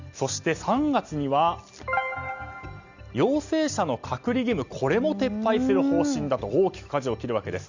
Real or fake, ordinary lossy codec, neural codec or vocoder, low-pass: real; Opus, 64 kbps; none; 7.2 kHz